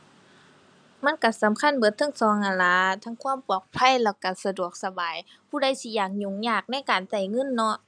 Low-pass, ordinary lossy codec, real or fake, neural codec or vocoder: 9.9 kHz; none; real; none